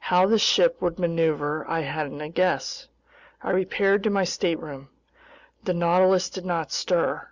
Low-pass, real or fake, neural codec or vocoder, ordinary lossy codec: 7.2 kHz; real; none; Opus, 64 kbps